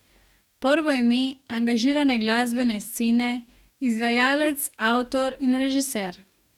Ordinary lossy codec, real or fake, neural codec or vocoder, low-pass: none; fake; codec, 44.1 kHz, 2.6 kbps, DAC; 19.8 kHz